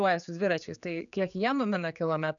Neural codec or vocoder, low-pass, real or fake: codec, 16 kHz, 4 kbps, X-Codec, HuBERT features, trained on general audio; 7.2 kHz; fake